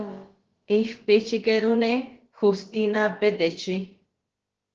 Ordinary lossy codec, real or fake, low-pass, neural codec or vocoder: Opus, 16 kbps; fake; 7.2 kHz; codec, 16 kHz, about 1 kbps, DyCAST, with the encoder's durations